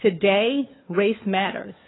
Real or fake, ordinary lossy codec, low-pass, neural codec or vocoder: fake; AAC, 16 kbps; 7.2 kHz; codec, 16 kHz, 4 kbps, FunCodec, trained on LibriTTS, 50 frames a second